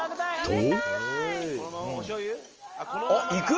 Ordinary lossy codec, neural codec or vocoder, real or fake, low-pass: Opus, 24 kbps; none; real; 7.2 kHz